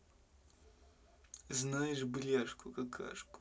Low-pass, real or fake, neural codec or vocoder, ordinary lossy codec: none; real; none; none